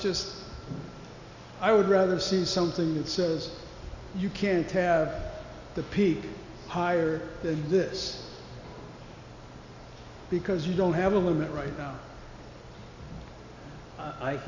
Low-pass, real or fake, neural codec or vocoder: 7.2 kHz; real; none